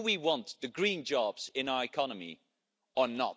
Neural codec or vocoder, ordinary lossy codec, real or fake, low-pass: none; none; real; none